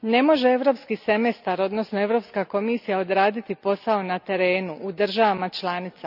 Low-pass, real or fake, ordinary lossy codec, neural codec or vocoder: 5.4 kHz; real; none; none